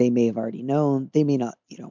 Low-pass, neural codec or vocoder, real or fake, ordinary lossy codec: 7.2 kHz; none; real; MP3, 64 kbps